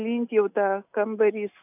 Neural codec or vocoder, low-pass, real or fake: none; 3.6 kHz; real